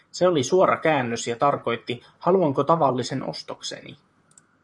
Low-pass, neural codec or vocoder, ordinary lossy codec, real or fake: 10.8 kHz; vocoder, 44.1 kHz, 128 mel bands, Pupu-Vocoder; MP3, 96 kbps; fake